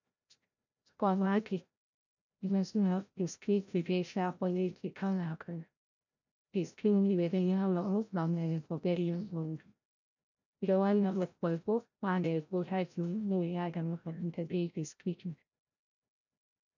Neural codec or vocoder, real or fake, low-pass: codec, 16 kHz, 0.5 kbps, FreqCodec, larger model; fake; 7.2 kHz